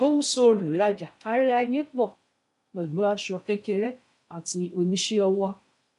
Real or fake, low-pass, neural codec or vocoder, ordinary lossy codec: fake; 10.8 kHz; codec, 16 kHz in and 24 kHz out, 0.6 kbps, FocalCodec, streaming, 4096 codes; none